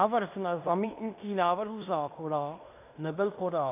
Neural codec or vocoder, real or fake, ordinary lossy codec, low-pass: codec, 16 kHz in and 24 kHz out, 0.9 kbps, LongCat-Audio-Codec, fine tuned four codebook decoder; fake; AAC, 32 kbps; 3.6 kHz